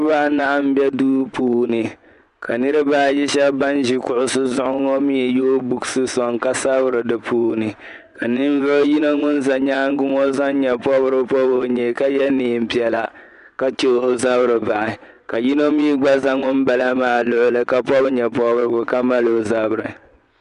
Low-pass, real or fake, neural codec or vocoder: 10.8 kHz; fake; vocoder, 24 kHz, 100 mel bands, Vocos